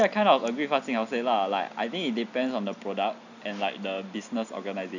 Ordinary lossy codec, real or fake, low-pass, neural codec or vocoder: none; real; 7.2 kHz; none